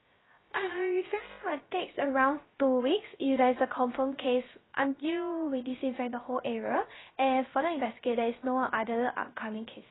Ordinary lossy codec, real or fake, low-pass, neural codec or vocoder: AAC, 16 kbps; fake; 7.2 kHz; codec, 16 kHz, 0.3 kbps, FocalCodec